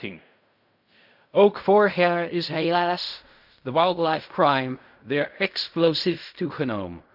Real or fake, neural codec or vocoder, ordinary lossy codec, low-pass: fake; codec, 16 kHz in and 24 kHz out, 0.4 kbps, LongCat-Audio-Codec, fine tuned four codebook decoder; none; 5.4 kHz